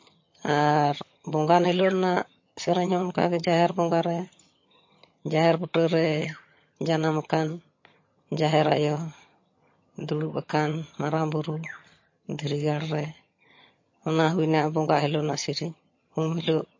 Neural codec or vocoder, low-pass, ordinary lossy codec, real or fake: vocoder, 22.05 kHz, 80 mel bands, HiFi-GAN; 7.2 kHz; MP3, 32 kbps; fake